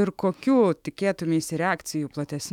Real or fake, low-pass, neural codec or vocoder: fake; 19.8 kHz; autoencoder, 48 kHz, 128 numbers a frame, DAC-VAE, trained on Japanese speech